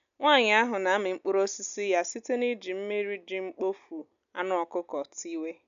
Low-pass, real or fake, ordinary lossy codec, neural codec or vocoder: 7.2 kHz; real; none; none